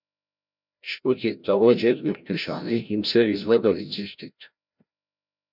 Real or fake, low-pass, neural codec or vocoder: fake; 5.4 kHz; codec, 16 kHz, 0.5 kbps, FreqCodec, larger model